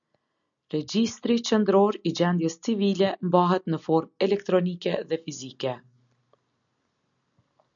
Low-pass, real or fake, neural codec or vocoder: 7.2 kHz; real; none